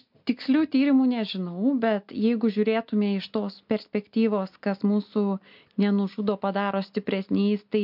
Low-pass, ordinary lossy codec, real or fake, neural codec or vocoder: 5.4 kHz; MP3, 48 kbps; real; none